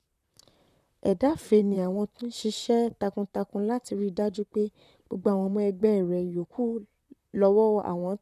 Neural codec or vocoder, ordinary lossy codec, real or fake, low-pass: vocoder, 44.1 kHz, 128 mel bands, Pupu-Vocoder; none; fake; 14.4 kHz